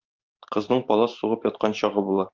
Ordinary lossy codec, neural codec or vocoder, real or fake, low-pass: Opus, 24 kbps; vocoder, 44.1 kHz, 128 mel bands every 512 samples, BigVGAN v2; fake; 7.2 kHz